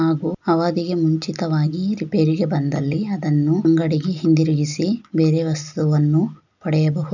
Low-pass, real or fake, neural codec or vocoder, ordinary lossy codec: 7.2 kHz; real; none; none